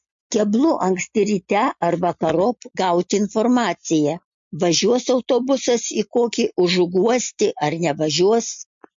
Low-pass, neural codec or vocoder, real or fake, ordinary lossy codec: 7.2 kHz; none; real; MP3, 48 kbps